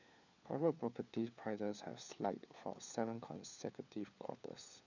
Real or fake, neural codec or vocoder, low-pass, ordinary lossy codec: fake; codec, 16 kHz, 4 kbps, FunCodec, trained on LibriTTS, 50 frames a second; 7.2 kHz; none